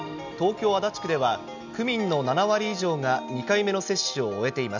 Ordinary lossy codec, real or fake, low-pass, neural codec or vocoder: none; real; 7.2 kHz; none